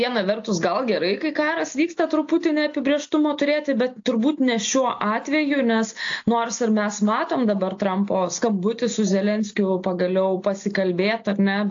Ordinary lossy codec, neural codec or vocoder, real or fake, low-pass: AAC, 48 kbps; none; real; 7.2 kHz